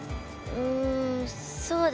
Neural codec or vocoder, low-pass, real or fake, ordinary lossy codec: none; none; real; none